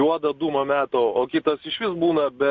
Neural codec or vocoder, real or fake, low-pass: none; real; 7.2 kHz